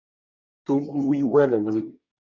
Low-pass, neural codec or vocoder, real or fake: 7.2 kHz; codec, 24 kHz, 1 kbps, SNAC; fake